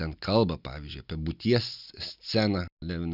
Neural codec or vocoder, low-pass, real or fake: none; 5.4 kHz; real